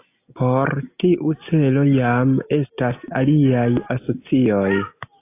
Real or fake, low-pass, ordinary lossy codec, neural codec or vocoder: real; 3.6 kHz; AAC, 24 kbps; none